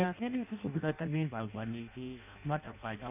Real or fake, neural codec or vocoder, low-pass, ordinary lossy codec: fake; codec, 16 kHz in and 24 kHz out, 0.6 kbps, FireRedTTS-2 codec; 3.6 kHz; none